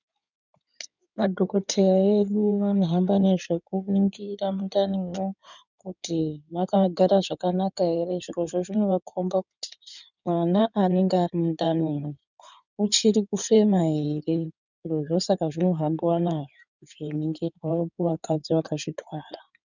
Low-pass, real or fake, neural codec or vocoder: 7.2 kHz; fake; codec, 16 kHz in and 24 kHz out, 2.2 kbps, FireRedTTS-2 codec